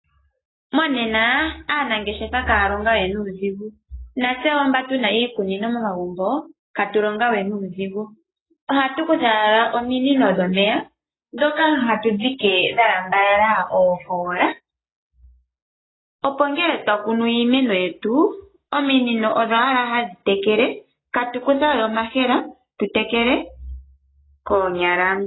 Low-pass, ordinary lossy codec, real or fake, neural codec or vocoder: 7.2 kHz; AAC, 16 kbps; real; none